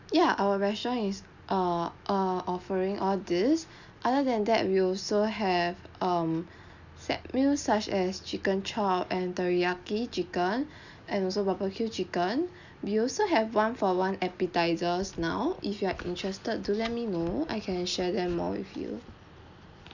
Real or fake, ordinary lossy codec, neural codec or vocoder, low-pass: real; none; none; 7.2 kHz